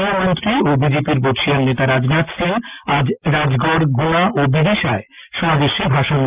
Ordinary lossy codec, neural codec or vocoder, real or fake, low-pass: Opus, 24 kbps; none; real; 3.6 kHz